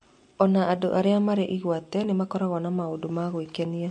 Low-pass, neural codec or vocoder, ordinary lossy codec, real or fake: 10.8 kHz; none; MP3, 48 kbps; real